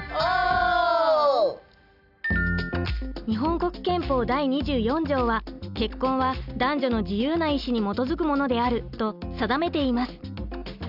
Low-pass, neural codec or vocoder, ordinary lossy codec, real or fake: 5.4 kHz; none; none; real